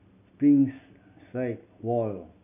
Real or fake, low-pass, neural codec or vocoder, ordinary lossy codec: real; 3.6 kHz; none; none